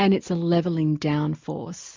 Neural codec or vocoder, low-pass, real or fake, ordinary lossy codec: none; 7.2 kHz; real; MP3, 64 kbps